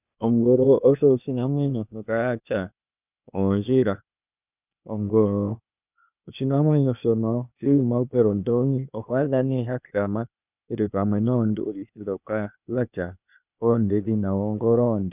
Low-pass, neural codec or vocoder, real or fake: 3.6 kHz; codec, 16 kHz, 0.8 kbps, ZipCodec; fake